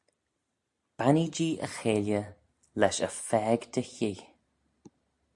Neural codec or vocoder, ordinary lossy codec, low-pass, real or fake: none; AAC, 64 kbps; 10.8 kHz; real